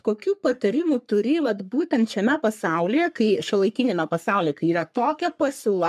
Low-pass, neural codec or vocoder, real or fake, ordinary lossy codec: 14.4 kHz; codec, 44.1 kHz, 3.4 kbps, Pupu-Codec; fake; MP3, 96 kbps